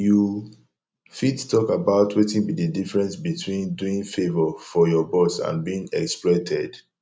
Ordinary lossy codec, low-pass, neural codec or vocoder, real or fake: none; none; none; real